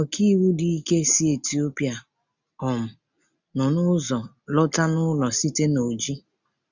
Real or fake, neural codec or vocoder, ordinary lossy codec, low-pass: real; none; none; 7.2 kHz